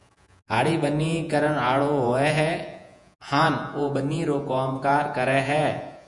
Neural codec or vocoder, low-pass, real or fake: vocoder, 48 kHz, 128 mel bands, Vocos; 10.8 kHz; fake